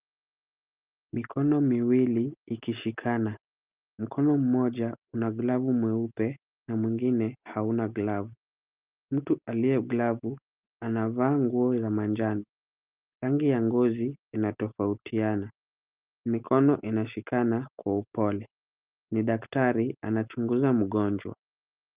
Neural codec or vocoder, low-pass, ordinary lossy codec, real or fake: none; 3.6 kHz; Opus, 32 kbps; real